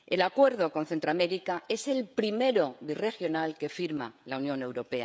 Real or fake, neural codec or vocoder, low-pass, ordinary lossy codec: fake; codec, 16 kHz, 16 kbps, FreqCodec, smaller model; none; none